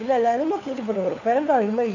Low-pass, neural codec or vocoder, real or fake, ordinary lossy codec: 7.2 kHz; codec, 16 kHz, 4 kbps, FunCodec, trained on LibriTTS, 50 frames a second; fake; none